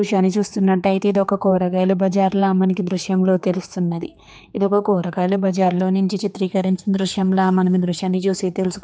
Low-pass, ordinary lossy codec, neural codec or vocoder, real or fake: none; none; codec, 16 kHz, 4 kbps, X-Codec, HuBERT features, trained on general audio; fake